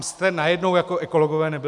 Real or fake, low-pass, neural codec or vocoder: real; 10.8 kHz; none